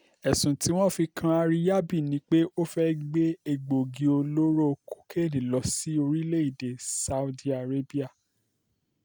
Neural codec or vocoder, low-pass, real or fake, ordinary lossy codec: none; none; real; none